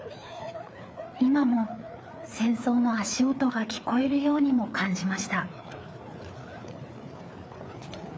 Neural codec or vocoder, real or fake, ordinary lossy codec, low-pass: codec, 16 kHz, 4 kbps, FreqCodec, larger model; fake; none; none